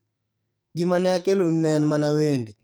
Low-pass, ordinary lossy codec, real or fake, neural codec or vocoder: none; none; fake; codec, 44.1 kHz, 2.6 kbps, SNAC